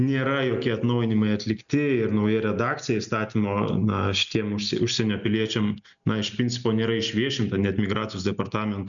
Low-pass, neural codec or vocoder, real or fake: 7.2 kHz; none; real